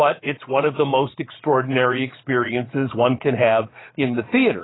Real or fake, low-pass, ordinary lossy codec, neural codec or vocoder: fake; 7.2 kHz; AAC, 16 kbps; vocoder, 22.05 kHz, 80 mel bands, Vocos